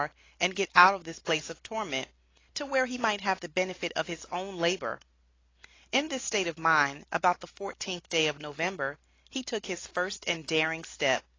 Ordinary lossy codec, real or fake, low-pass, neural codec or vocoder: AAC, 32 kbps; real; 7.2 kHz; none